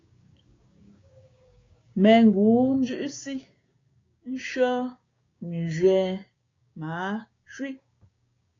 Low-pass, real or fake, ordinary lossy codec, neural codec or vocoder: 7.2 kHz; fake; AAC, 32 kbps; codec, 16 kHz, 6 kbps, DAC